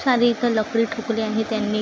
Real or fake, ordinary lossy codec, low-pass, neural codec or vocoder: real; none; none; none